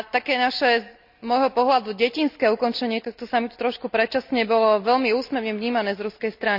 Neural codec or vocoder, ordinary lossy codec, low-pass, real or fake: none; none; 5.4 kHz; real